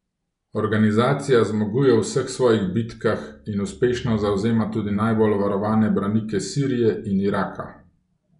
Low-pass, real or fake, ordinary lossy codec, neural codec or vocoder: 10.8 kHz; real; none; none